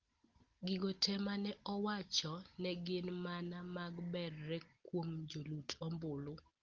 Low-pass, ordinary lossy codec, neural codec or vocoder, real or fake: 7.2 kHz; Opus, 16 kbps; none; real